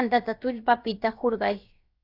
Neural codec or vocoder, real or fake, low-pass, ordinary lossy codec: codec, 16 kHz, about 1 kbps, DyCAST, with the encoder's durations; fake; 5.4 kHz; AAC, 32 kbps